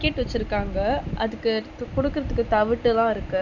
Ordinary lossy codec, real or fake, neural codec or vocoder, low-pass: none; real; none; 7.2 kHz